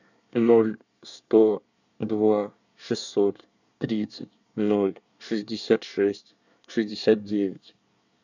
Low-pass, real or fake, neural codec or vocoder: 7.2 kHz; fake; codec, 24 kHz, 1 kbps, SNAC